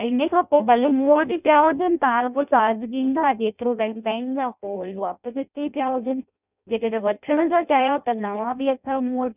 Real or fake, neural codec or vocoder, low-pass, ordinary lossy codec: fake; codec, 16 kHz in and 24 kHz out, 0.6 kbps, FireRedTTS-2 codec; 3.6 kHz; none